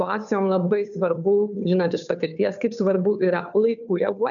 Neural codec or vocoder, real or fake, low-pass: codec, 16 kHz, 2 kbps, FunCodec, trained on Chinese and English, 25 frames a second; fake; 7.2 kHz